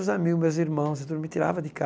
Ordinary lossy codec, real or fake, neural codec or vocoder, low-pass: none; real; none; none